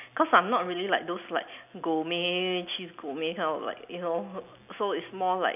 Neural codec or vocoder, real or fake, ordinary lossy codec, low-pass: none; real; none; 3.6 kHz